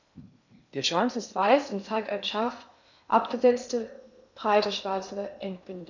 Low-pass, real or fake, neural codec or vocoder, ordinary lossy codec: 7.2 kHz; fake; codec, 16 kHz in and 24 kHz out, 0.8 kbps, FocalCodec, streaming, 65536 codes; none